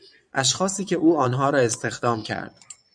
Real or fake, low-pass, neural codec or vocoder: fake; 9.9 kHz; vocoder, 22.05 kHz, 80 mel bands, Vocos